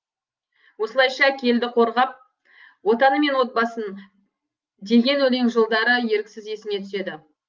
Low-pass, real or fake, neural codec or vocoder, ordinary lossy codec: 7.2 kHz; real; none; Opus, 32 kbps